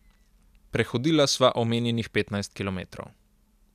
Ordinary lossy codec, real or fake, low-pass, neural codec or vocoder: none; real; 14.4 kHz; none